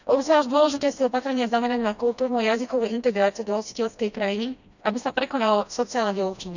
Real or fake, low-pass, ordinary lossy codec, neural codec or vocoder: fake; 7.2 kHz; none; codec, 16 kHz, 1 kbps, FreqCodec, smaller model